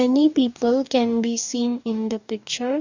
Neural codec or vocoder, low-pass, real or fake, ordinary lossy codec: codec, 44.1 kHz, 2.6 kbps, DAC; 7.2 kHz; fake; none